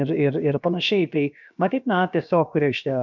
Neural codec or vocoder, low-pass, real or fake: codec, 16 kHz, about 1 kbps, DyCAST, with the encoder's durations; 7.2 kHz; fake